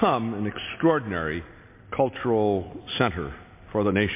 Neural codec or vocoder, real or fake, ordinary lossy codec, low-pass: none; real; MP3, 24 kbps; 3.6 kHz